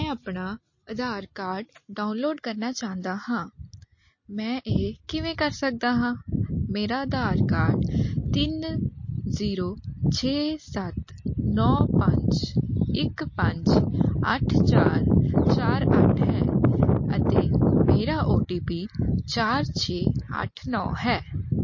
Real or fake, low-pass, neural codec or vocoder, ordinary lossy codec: real; 7.2 kHz; none; MP3, 32 kbps